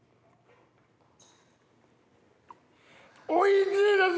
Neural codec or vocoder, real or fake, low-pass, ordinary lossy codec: none; real; none; none